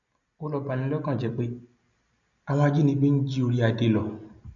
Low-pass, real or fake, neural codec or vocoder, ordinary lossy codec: 7.2 kHz; real; none; none